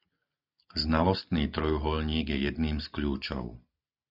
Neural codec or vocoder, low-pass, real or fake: none; 5.4 kHz; real